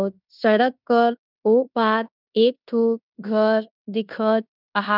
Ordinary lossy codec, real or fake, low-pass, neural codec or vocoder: none; fake; 5.4 kHz; codec, 16 kHz in and 24 kHz out, 0.9 kbps, LongCat-Audio-Codec, fine tuned four codebook decoder